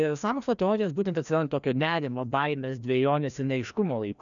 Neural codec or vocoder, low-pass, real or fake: codec, 16 kHz, 1 kbps, FreqCodec, larger model; 7.2 kHz; fake